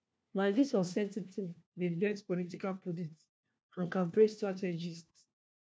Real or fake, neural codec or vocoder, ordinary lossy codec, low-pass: fake; codec, 16 kHz, 1 kbps, FunCodec, trained on LibriTTS, 50 frames a second; none; none